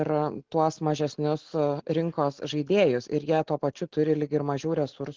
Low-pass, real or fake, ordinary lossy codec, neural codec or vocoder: 7.2 kHz; real; Opus, 16 kbps; none